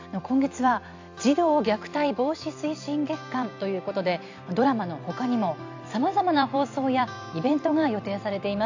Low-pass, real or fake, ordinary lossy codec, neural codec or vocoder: 7.2 kHz; fake; AAC, 48 kbps; vocoder, 44.1 kHz, 128 mel bands every 256 samples, BigVGAN v2